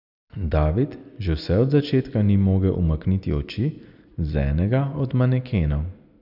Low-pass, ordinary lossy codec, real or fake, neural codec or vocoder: 5.4 kHz; none; real; none